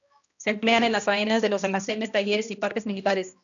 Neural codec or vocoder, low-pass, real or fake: codec, 16 kHz, 1 kbps, X-Codec, HuBERT features, trained on balanced general audio; 7.2 kHz; fake